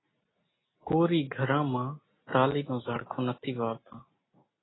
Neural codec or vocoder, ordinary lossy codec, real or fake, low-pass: none; AAC, 16 kbps; real; 7.2 kHz